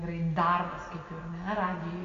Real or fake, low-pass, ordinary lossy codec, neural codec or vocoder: real; 7.2 kHz; AAC, 48 kbps; none